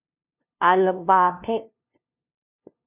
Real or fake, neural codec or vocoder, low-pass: fake; codec, 16 kHz, 0.5 kbps, FunCodec, trained on LibriTTS, 25 frames a second; 3.6 kHz